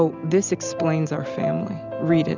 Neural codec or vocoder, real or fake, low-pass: none; real; 7.2 kHz